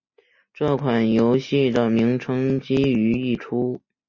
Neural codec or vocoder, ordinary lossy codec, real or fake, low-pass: none; MP3, 32 kbps; real; 7.2 kHz